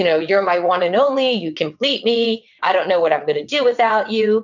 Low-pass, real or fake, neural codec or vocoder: 7.2 kHz; fake; vocoder, 22.05 kHz, 80 mel bands, WaveNeXt